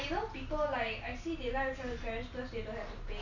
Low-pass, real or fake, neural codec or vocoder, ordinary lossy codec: 7.2 kHz; real; none; none